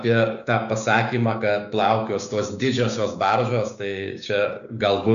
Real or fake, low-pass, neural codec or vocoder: fake; 7.2 kHz; codec, 16 kHz, 6 kbps, DAC